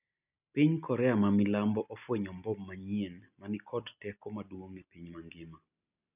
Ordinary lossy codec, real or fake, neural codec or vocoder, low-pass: none; real; none; 3.6 kHz